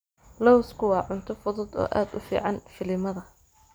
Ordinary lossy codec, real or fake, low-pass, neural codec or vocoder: none; real; none; none